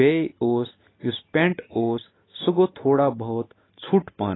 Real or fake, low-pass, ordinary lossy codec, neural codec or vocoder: real; 7.2 kHz; AAC, 16 kbps; none